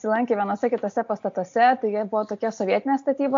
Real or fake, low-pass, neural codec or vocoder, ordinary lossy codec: real; 7.2 kHz; none; MP3, 48 kbps